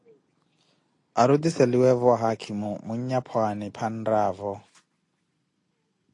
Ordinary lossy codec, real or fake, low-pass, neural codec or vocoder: AAC, 48 kbps; real; 10.8 kHz; none